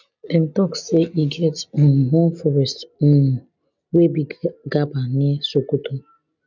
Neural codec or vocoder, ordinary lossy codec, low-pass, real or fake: none; none; 7.2 kHz; real